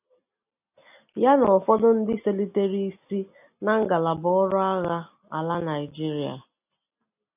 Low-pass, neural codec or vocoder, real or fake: 3.6 kHz; none; real